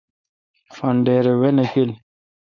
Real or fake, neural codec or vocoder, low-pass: fake; codec, 16 kHz, 4.8 kbps, FACodec; 7.2 kHz